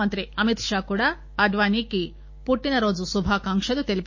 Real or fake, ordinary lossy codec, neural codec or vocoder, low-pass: fake; MP3, 32 kbps; autoencoder, 48 kHz, 128 numbers a frame, DAC-VAE, trained on Japanese speech; 7.2 kHz